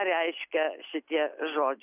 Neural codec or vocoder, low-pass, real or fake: none; 3.6 kHz; real